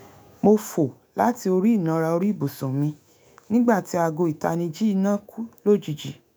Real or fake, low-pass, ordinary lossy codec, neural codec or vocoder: fake; none; none; autoencoder, 48 kHz, 128 numbers a frame, DAC-VAE, trained on Japanese speech